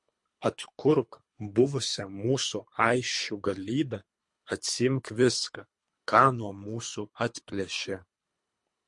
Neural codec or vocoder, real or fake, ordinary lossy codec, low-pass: codec, 24 kHz, 3 kbps, HILCodec; fake; MP3, 48 kbps; 10.8 kHz